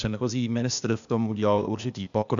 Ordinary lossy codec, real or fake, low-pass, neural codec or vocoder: MP3, 96 kbps; fake; 7.2 kHz; codec, 16 kHz, 0.8 kbps, ZipCodec